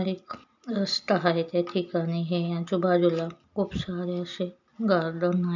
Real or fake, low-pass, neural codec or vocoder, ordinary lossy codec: real; 7.2 kHz; none; none